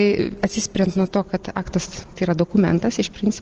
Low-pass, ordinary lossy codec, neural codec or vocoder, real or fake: 7.2 kHz; Opus, 16 kbps; none; real